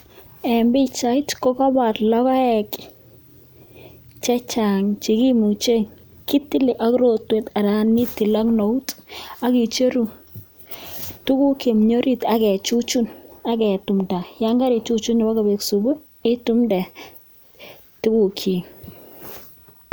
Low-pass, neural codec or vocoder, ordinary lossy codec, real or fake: none; none; none; real